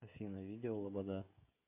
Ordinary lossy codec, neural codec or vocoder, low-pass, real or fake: MP3, 24 kbps; codec, 16 kHz, 16 kbps, FreqCodec, smaller model; 3.6 kHz; fake